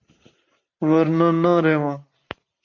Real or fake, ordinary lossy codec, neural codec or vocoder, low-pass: fake; MP3, 64 kbps; vocoder, 44.1 kHz, 128 mel bands every 512 samples, BigVGAN v2; 7.2 kHz